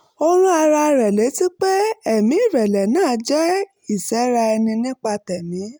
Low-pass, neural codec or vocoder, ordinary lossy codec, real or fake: none; none; none; real